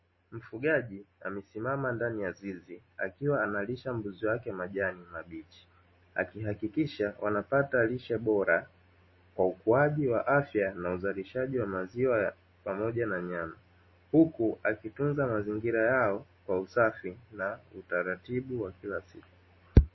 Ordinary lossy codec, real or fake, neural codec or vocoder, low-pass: MP3, 24 kbps; real; none; 7.2 kHz